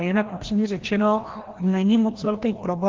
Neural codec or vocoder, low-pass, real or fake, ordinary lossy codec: codec, 16 kHz, 1 kbps, FreqCodec, larger model; 7.2 kHz; fake; Opus, 16 kbps